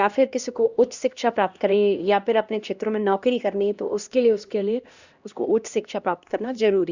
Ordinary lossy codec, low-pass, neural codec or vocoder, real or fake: Opus, 64 kbps; 7.2 kHz; codec, 16 kHz, 1 kbps, X-Codec, HuBERT features, trained on LibriSpeech; fake